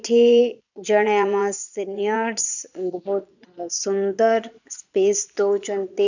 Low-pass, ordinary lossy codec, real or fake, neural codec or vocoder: 7.2 kHz; none; fake; vocoder, 22.05 kHz, 80 mel bands, Vocos